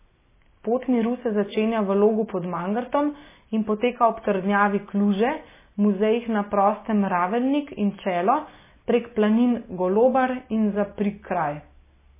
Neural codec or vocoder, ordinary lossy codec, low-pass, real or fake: none; MP3, 16 kbps; 3.6 kHz; real